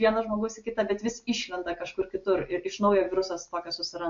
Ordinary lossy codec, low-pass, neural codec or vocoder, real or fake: MP3, 48 kbps; 7.2 kHz; none; real